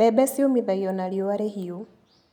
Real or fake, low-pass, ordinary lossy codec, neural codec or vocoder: real; 19.8 kHz; none; none